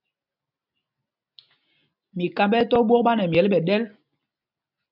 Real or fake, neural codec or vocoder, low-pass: real; none; 5.4 kHz